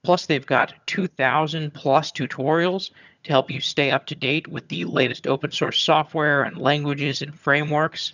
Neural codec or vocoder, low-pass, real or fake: vocoder, 22.05 kHz, 80 mel bands, HiFi-GAN; 7.2 kHz; fake